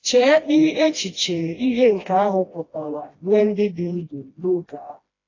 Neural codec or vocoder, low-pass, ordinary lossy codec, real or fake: codec, 16 kHz, 1 kbps, FreqCodec, smaller model; 7.2 kHz; AAC, 32 kbps; fake